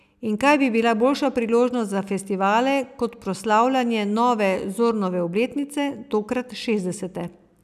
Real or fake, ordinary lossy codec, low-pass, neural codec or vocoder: real; none; 14.4 kHz; none